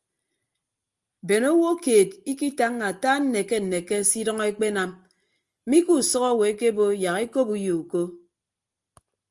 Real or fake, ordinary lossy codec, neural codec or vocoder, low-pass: real; Opus, 32 kbps; none; 10.8 kHz